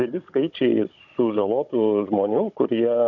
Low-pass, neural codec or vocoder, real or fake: 7.2 kHz; codec, 16 kHz, 16 kbps, FunCodec, trained on Chinese and English, 50 frames a second; fake